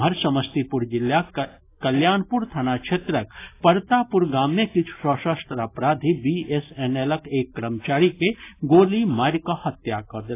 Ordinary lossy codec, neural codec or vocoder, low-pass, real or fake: AAC, 24 kbps; none; 3.6 kHz; real